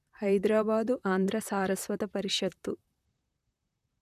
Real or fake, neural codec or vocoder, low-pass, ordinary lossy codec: fake; vocoder, 44.1 kHz, 128 mel bands, Pupu-Vocoder; 14.4 kHz; none